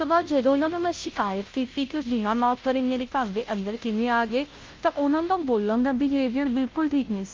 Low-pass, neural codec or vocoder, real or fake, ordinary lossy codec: 7.2 kHz; codec, 16 kHz, 0.5 kbps, FunCodec, trained on Chinese and English, 25 frames a second; fake; Opus, 32 kbps